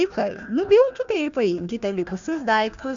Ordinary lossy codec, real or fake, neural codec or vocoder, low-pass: Opus, 64 kbps; fake; codec, 16 kHz, 1 kbps, FunCodec, trained on Chinese and English, 50 frames a second; 7.2 kHz